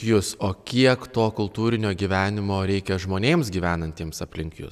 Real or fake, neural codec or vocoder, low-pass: real; none; 14.4 kHz